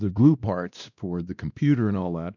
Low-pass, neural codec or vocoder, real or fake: 7.2 kHz; codec, 16 kHz, 1 kbps, X-Codec, WavLM features, trained on Multilingual LibriSpeech; fake